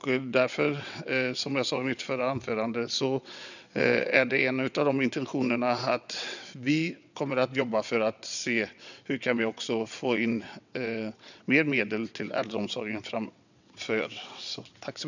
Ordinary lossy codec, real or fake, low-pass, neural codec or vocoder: none; fake; 7.2 kHz; vocoder, 22.05 kHz, 80 mel bands, WaveNeXt